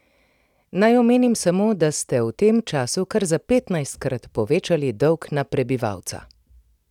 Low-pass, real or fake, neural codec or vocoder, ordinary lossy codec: 19.8 kHz; fake; vocoder, 44.1 kHz, 128 mel bands every 256 samples, BigVGAN v2; none